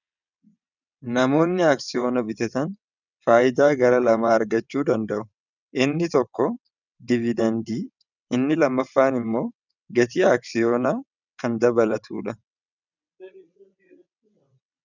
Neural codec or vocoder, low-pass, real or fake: vocoder, 22.05 kHz, 80 mel bands, WaveNeXt; 7.2 kHz; fake